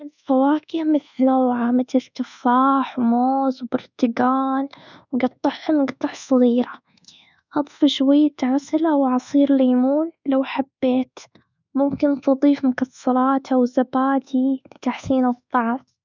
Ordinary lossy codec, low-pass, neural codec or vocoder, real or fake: none; 7.2 kHz; codec, 24 kHz, 1.2 kbps, DualCodec; fake